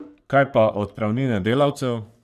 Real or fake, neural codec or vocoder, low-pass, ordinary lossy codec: fake; codec, 44.1 kHz, 3.4 kbps, Pupu-Codec; 14.4 kHz; none